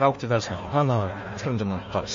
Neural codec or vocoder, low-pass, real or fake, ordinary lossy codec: codec, 16 kHz, 1 kbps, FunCodec, trained on Chinese and English, 50 frames a second; 7.2 kHz; fake; MP3, 32 kbps